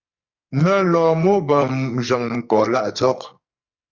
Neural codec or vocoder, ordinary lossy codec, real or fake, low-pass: codec, 44.1 kHz, 2.6 kbps, SNAC; Opus, 64 kbps; fake; 7.2 kHz